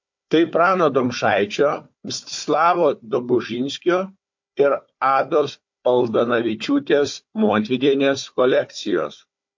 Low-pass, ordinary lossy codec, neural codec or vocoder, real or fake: 7.2 kHz; MP3, 48 kbps; codec, 16 kHz, 4 kbps, FunCodec, trained on Chinese and English, 50 frames a second; fake